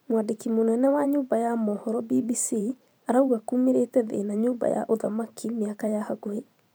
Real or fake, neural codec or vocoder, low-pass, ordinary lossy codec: fake; vocoder, 44.1 kHz, 128 mel bands every 512 samples, BigVGAN v2; none; none